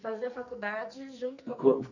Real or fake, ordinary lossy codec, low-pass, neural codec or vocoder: fake; none; 7.2 kHz; codec, 44.1 kHz, 2.6 kbps, SNAC